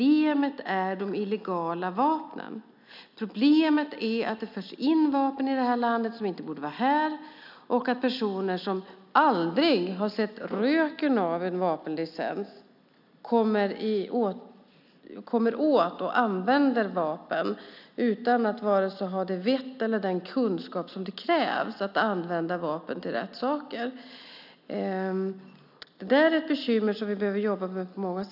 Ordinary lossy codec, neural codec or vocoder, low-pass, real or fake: none; none; 5.4 kHz; real